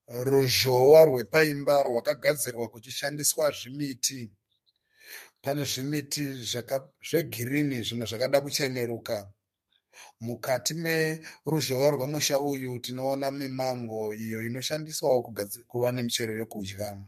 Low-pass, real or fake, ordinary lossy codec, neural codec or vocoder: 14.4 kHz; fake; MP3, 64 kbps; codec, 32 kHz, 1.9 kbps, SNAC